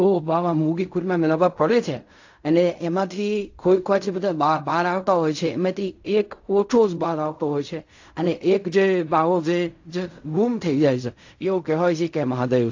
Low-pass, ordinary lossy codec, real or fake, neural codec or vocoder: 7.2 kHz; AAC, 48 kbps; fake; codec, 16 kHz in and 24 kHz out, 0.4 kbps, LongCat-Audio-Codec, fine tuned four codebook decoder